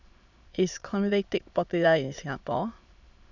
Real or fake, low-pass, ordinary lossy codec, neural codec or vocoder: fake; 7.2 kHz; none; autoencoder, 22.05 kHz, a latent of 192 numbers a frame, VITS, trained on many speakers